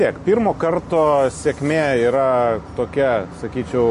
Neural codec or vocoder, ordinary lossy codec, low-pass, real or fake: none; MP3, 48 kbps; 10.8 kHz; real